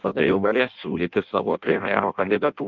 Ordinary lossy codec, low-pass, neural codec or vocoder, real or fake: Opus, 32 kbps; 7.2 kHz; codec, 16 kHz in and 24 kHz out, 0.6 kbps, FireRedTTS-2 codec; fake